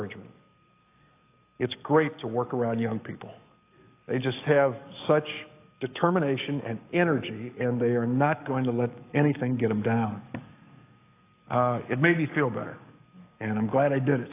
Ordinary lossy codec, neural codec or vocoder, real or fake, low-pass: AAC, 24 kbps; codec, 44.1 kHz, 7.8 kbps, DAC; fake; 3.6 kHz